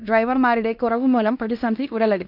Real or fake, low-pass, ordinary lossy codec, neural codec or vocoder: fake; 5.4 kHz; none; codec, 16 kHz in and 24 kHz out, 0.9 kbps, LongCat-Audio-Codec, fine tuned four codebook decoder